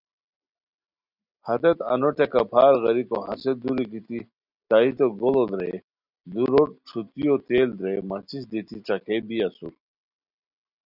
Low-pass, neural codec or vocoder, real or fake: 5.4 kHz; none; real